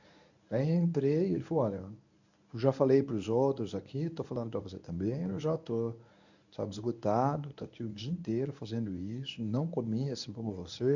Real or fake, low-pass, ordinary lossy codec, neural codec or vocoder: fake; 7.2 kHz; none; codec, 24 kHz, 0.9 kbps, WavTokenizer, medium speech release version 1